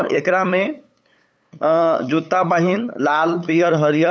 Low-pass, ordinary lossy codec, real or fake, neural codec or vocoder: none; none; fake; codec, 16 kHz, 8 kbps, FunCodec, trained on LibriTTS, 25 frames a second